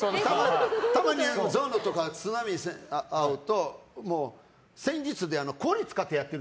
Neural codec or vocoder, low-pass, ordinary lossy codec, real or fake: none; none; none; real